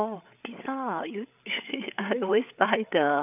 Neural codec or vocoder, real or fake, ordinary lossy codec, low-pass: codec, 16 kHz, 8 kbps, FreqCodec, larger model; fake; none; 3.6 kHz